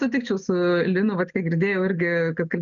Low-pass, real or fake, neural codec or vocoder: 7.2 kHz; real; none